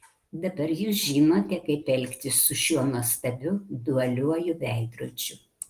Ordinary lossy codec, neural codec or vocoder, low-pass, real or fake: Opus, 24 kbps; none; 14.4 kHz; real